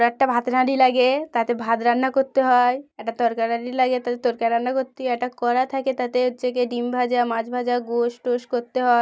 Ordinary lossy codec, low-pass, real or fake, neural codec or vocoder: none; none; real; none